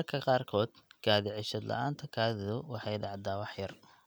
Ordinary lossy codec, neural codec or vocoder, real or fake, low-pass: none; none; real; none